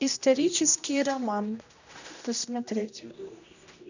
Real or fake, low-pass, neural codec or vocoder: fake; 7.2 kHz; codec, 16 kHz, 1 kbps, X-Codec, HuBERT features, trained on general audio